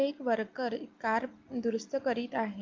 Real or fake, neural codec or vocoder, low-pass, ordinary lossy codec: real; none; 7.2 kHz; Opus, 24 kbps